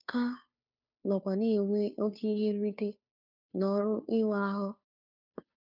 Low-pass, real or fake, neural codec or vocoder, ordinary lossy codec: 5.4 kHz; fake; codec, 16 kHz, 2 kbps, FunCodec, trained on Chinese and English, 25 frames a second; none